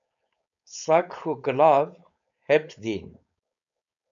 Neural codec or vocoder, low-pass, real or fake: codec, 16 kHz, 4.8 kbps, FACodec; 7.2 kHz; fake